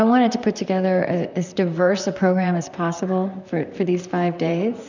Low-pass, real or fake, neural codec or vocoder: 7.2 kHz; fake; vocoder, 44.1 kHz, 128 mel bands, Pupu-Vocoder